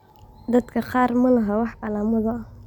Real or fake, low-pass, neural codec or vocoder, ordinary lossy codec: real; 19.8 kHz; none; none